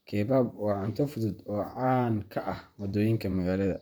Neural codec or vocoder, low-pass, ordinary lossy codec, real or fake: vocoder, 44.1 kHz, 128 mel bands, Pupu-Vocoder; none; none; fake